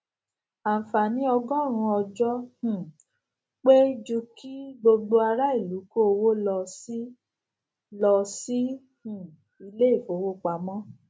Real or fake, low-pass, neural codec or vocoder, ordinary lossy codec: real; none; none; none